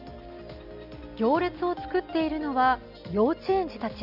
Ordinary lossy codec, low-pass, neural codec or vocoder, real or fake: none; 5.4 kHz; none; real